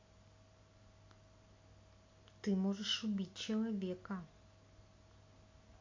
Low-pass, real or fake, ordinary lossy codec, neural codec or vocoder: 7.2 kHz; real; MP3, 48 kbps; none